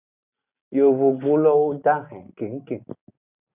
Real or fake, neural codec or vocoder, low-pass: real; none; 3.6 kHz